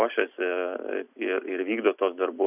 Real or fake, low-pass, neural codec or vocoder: real; 3.6 kHz; none